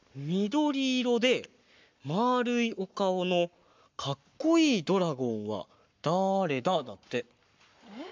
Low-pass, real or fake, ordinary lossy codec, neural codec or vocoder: 7.2 kHz; fake; MP3, 64 kbps; codec, 44.1 kHz, 7.8 kbps, Pupu-Codec